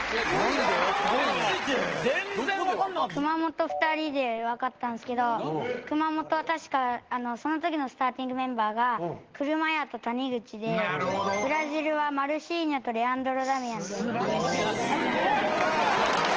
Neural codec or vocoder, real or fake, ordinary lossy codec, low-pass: none; real; Opus, 16 kbps; 7.2 kHz